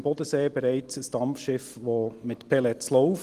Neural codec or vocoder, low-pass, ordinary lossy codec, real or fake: none; 14.4 kHz; Opus, 24 kbps; real